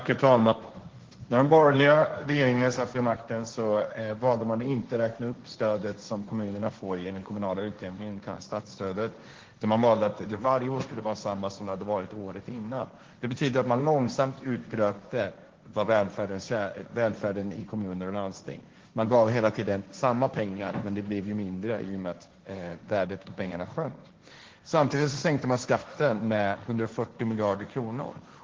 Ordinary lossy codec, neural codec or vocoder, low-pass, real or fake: Opus, 16 kbps; codec, 16 kHz, 1.1 kbps, Voila-Tokenizer; 7.2 kHz; fake